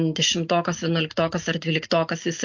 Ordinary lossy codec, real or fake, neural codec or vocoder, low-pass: MP3, 48 kbps; real; none; 7.2 kHz